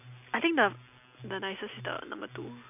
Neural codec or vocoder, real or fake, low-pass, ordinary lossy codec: none; real; 3.6 kHz; none